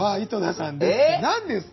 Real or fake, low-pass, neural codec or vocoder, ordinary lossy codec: real; 7.2 kHz; none; MP3, 24 kbps